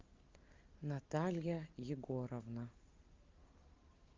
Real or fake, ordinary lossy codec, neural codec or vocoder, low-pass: real; Opus, 24 kbps; none; 7.2 kHz